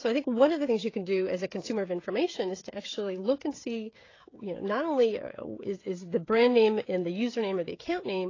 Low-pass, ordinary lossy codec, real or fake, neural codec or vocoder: 7.2 kHz; AAC, 32 kbps; fake; codec, 16 kHz, 16 kbps, FreqCodec, smaller model